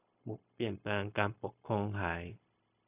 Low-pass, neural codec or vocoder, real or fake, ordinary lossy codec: 3.6 kHz; codec, 16 kHz, 0.4 kbps, LongCat-Audio-Codec; fake; AAC, 32 kbps